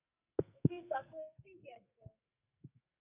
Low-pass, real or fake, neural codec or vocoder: 3.6 kHz; fake; codec, 44.1 kHz, 2.6 kbps, SNAC